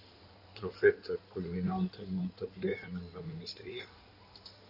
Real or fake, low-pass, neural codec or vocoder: fake; 5.4 kHz; codec, 16 kHz in and 24 kHz out, 2.2 kbps, FireRedTTS-2 codec